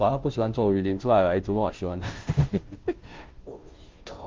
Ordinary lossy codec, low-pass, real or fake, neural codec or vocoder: Opus, 32 kbps; 7.2 kHz; fake; codec, 16 kHz, 0.5 kbps, FunCodec, trained on Chinese and English, 25 frames a second